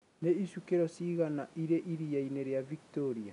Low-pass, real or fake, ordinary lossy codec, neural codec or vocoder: 10.8 kHz; real; none; none